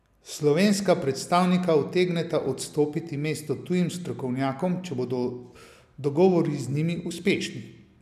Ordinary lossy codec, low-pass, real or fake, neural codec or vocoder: none; 14.4 kHz; real; none